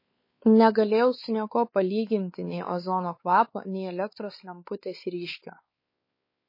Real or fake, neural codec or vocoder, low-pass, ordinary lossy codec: fake; codec, 16 kHz, 4 kbps, X-Codec, WavLM features, trained on Multilingual LibriSpeech; 5.4 kHz; MP3, 24 kbps